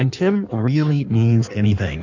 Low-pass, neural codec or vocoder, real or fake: 7.2 kHz; codec, 16 kHz in and 24 kHz out, 1.1 kbps, FireRedTTS-2 codec; fake